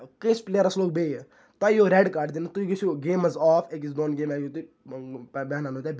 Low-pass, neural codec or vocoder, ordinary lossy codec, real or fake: none; none; none; real